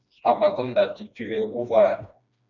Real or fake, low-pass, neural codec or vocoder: fake; 7.2 kHz; codec, 16 kHz, 1 kbps, FreqCodec, smaller model